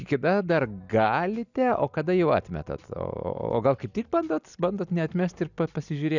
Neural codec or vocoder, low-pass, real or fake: none; 7.2 kHz; real